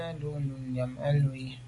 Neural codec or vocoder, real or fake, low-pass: none; real; 10.8 kHz